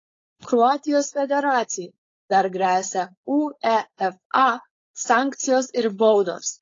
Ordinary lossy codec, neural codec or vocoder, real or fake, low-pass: AAC, 32 kbps; codec, 16 kHz, 4.8 kbps, FACodec; fake; 7.2 kHz